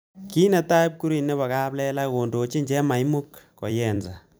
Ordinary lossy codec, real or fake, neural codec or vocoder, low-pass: none; fake; vocoder, 44.1 kHz, 128 mel bands every 256 samples, BigVGAN v2; none